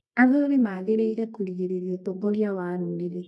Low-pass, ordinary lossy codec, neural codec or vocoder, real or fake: none; none; codec, 24 kHz, 0.9 kbps, WavTokenizer, medium music audio release; fake